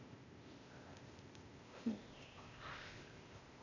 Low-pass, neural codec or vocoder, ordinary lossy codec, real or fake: 7.2 kHz; codec, 16 kHz, 0.8 kbps, ZipCodec; none; fake